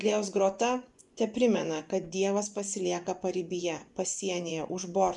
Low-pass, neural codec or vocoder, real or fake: 10.8 kHz; vocoder, 24 kHz, 100 mel bands, Vocos; fake